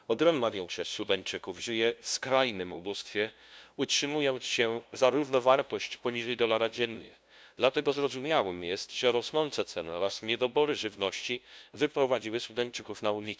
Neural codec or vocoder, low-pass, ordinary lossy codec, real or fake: codec, 16 kHz, 0.5 kbps, FunCodec, trained on LibriTTS, 25 frames a second; none; none; fake